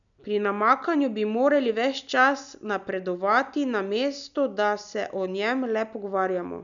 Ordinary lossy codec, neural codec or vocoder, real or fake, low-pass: none; none; real; 7.2 kHz